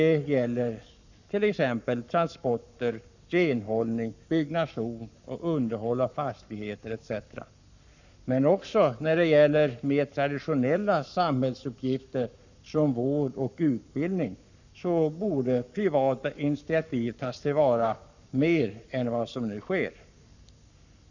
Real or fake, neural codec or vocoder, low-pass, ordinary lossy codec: fake; codec, 44.1 kHz, 7.8 kbps, Pupu-Codec; 7.2 kHz; none